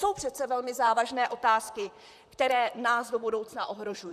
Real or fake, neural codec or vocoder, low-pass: fake; vocoder, 44.1 kHz, 128 mel bands, Pupu-Vocoder; 14.4 kHz